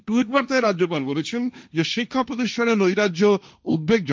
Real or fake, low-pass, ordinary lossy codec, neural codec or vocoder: fake; none; none; codec, 16 kHz, 1.1 kbps, Voila-Tokenizer